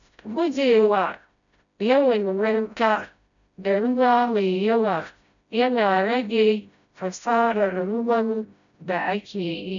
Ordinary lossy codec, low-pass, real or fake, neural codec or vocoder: MP3, 96 kbps; 7.2 kHz; fake; codec, 16 kHz, 0.5 kbps, FreqCodec, smaller model